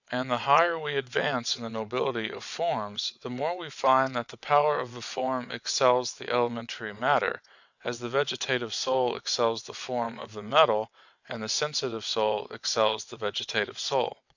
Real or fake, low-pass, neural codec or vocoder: fake; 7.2 kHz; vocoder, 22.05 kHz, 80 mel bands, WaveNeXt